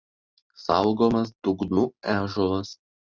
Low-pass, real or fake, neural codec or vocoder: 7.2 kHz; real; none